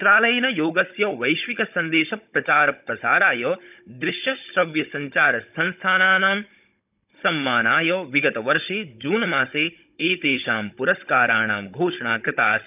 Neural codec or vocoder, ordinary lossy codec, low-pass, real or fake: codec, 16 kHz, 16 kbps, FunCodec, trained on Chinese and English, 50 frames a second; none; 3.6 kHz; fake